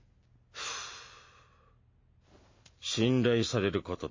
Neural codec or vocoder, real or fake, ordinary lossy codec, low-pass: none; real; MP3, 32 kbps; 7.2 kHz